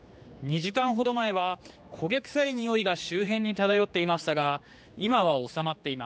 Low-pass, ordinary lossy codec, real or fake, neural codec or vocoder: none; none; fake; codec, 16 kHz, 2 kbps, X-Codec, HuBERT features, trained on general audio